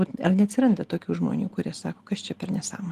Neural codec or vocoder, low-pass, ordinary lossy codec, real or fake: none; 14.4 kHz; Opus, 24 kbps; real